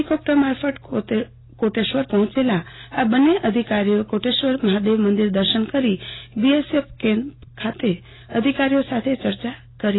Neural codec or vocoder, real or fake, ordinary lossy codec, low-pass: none; real; AAC, 16 kbps; 7.2 kHz